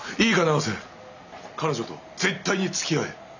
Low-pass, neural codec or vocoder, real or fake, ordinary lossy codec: 7.2 kHz; none; real; none